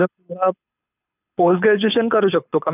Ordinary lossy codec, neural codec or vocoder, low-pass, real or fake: none; codec, 24 kHz, 6 kbps, HILCodec; 3.6 kHz; fake